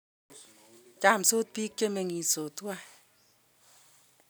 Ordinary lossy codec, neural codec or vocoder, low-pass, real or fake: none; none; none; real